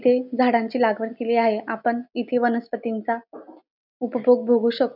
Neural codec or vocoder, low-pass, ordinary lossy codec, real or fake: none; 5.4 kHz; none; real